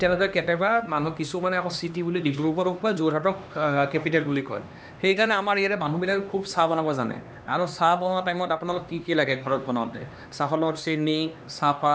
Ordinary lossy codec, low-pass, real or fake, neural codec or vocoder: none; none; fake; codec, 16 kHz, 2 kbps, X-Codec, HuBERT features, trained on LibriSpeech